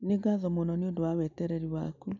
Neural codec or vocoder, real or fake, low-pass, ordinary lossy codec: none; real; 7.2 kHz; none